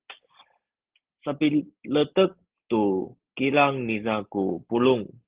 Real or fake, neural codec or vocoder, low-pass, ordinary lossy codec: real; none; 3.6 kHz; Opus, 16 kbps